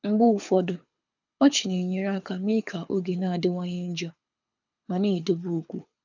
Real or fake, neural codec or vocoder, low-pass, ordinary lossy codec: fake; codec, 24 kHz, 6 kbps, HILCodec; 7.2 kHz; none